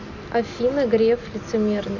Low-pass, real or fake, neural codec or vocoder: 7.2 kHz; real; none